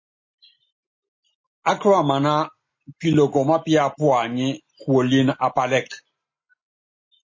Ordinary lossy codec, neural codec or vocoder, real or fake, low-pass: MP3, 32 kbps; none; real; 7.2 kHz